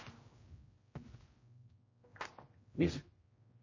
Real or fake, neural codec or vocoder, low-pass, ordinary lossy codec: fake; codec, 16 kHz, 0.5 kbps, X-Codec, HuBERT features, trained on general audio; 7.2 kHz; MP3, 32 kbps